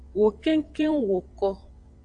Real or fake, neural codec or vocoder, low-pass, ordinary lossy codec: fake; vocoder, 22.05 kHz, 80 mel bands, WaveNeXt; 9.9 kHz; AAC, 64 kbps